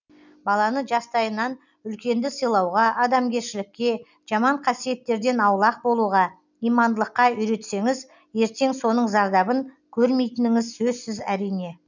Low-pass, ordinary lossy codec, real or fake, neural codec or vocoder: 7.2 kHz; none; real; none